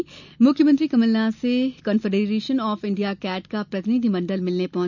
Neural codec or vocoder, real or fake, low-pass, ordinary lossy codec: none; real; 7.2 kHz; none